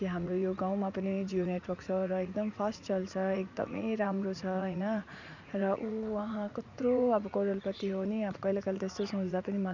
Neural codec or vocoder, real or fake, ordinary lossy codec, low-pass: vocoder, 44.1 kHz, 80 mel bands, Vocos; fake; none; 7.2 kHz